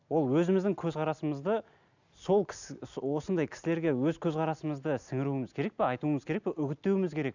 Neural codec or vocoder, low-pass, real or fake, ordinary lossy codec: none; 7.2 kHz; real; none